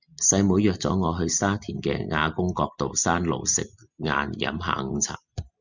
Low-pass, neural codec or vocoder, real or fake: 7.2 kHz; none; real